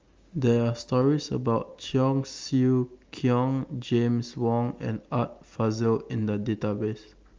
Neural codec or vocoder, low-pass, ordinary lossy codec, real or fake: none; 7.2 kHz; Opus, 32 kbps; real